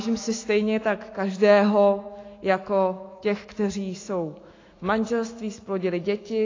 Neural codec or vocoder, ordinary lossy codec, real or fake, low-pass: autoencoder, 48 kHz, 128 numbers a frame, DAC-VAE, trained on Japanese speech; AAC, 32 kbps; fake; 7.2 kHz